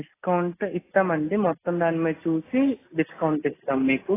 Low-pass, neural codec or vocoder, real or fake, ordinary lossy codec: 3.6 kHz; none; real; AAC, 16 kbps